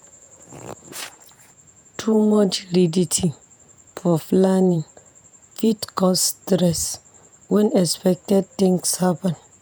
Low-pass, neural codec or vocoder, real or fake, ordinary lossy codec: none; vocoder, 48 kHz, 128 mel bands, Vocos; fake; none